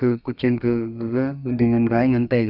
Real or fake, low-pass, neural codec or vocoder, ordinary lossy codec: fake; 5.4 kHz; codec, 32 kHz, 1.9 kbps, SNAC; none